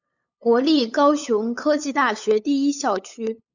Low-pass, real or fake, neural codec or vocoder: 7.2 kHz; fake; codec, 16 kHz, 8 kbps, FunCodec, trained on LibriTTS, 25 frames a second